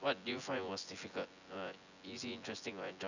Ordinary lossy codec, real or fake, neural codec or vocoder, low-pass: none; fake; vocoder, 24 kHz, 100 mel bands, Vocos; 7.2 kHz